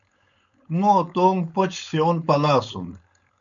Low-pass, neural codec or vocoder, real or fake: 7.2 kHz; codec, 16 kHz, 4.8 kbps, FACodec; fake